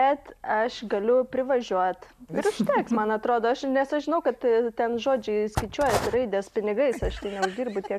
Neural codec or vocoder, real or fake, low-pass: none; real; 14.4 kHz